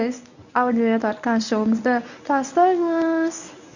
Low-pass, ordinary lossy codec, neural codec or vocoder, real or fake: 7.2 kHz; none; codec, 24 kHz, 0.9 kbps, WavTokenizer, medium speech release version 1; fake